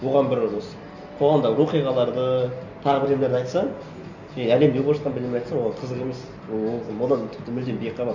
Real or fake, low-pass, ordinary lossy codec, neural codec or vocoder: real; 7.2 kHz; none; none